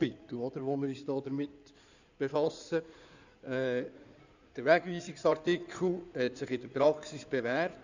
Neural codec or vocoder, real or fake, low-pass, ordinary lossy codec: codec, 16 kHz in and 24 kHz out, 2.2 kbps, FireRedTTS-2 codec; fake; 7.2 kHz; none